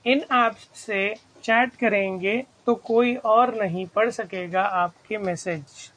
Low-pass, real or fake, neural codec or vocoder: 9.9 kHz; real; none